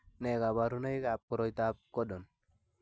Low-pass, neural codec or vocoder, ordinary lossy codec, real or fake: none; none; none; real